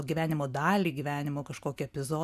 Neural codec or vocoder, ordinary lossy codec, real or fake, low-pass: none; MP3, 96 kbps; real; 14.4 kHz